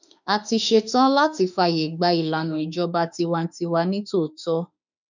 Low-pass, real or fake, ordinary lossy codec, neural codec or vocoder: 7.2 kHz; fake; none; autoencoder, 48 kHz, 32 numbers a frame, DAC-VAE, trained on Japanese speech